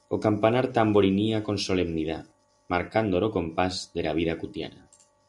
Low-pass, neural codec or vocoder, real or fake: 10.8 kHz; none; real